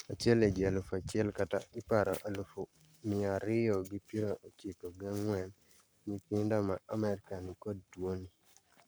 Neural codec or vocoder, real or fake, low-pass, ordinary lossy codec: codec, 44.1 kHz, 7.8 kbps, Pupu-Codec; fake; none; none